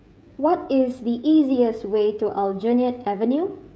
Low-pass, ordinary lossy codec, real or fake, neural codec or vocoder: none; none; fake; codec, 16 kHz, 16 kbps, FreqCodec, smaller model